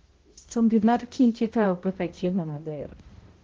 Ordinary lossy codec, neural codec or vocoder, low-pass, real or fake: Opus, 16 kbps; codec, 16 kHz, 0.5 kbps, X-Codec, HuBERT features, trained on balanced general audio; 7.2 kHz; fake